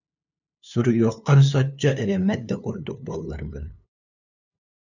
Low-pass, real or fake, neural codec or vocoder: 7.2 kHz; fake; codec, 16 kHz, 2 kbps, FunCodec, trained on LibriTTS, 25 frames a second